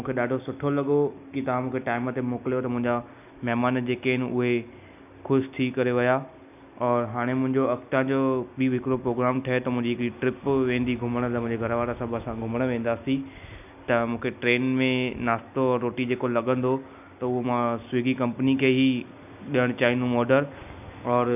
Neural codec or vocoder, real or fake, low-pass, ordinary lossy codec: none; real; 3.6 kHz; none